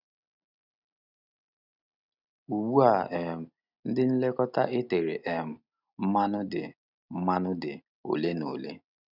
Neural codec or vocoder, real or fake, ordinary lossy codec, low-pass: none; real; none; 5.4 kHz